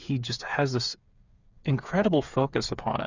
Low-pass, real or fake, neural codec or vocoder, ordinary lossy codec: 7.2 kHz; fake; codec, 16 kHz, 8 kbps, FreqCodec, smaller model; Opus, 64 kbps